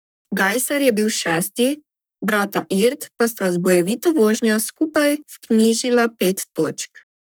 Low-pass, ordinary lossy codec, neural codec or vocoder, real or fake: none; none; codec, 44.1 kHz, 3.4 kbps, Pupu-Codec; fake